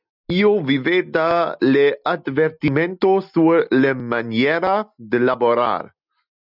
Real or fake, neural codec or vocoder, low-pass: real; none; 5.4 kHz